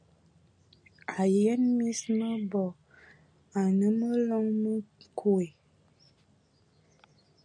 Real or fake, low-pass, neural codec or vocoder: real; 9.9 kHz; none